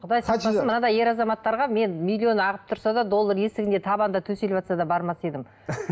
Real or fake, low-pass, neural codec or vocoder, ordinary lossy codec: real; none; none; none